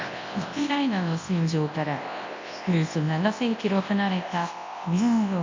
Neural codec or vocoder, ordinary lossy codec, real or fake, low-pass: codec, 24 kHz, 0.9 kbps, WavTokenizer, large speech release; MP3, 48 kbps; fake; 7.2 kHz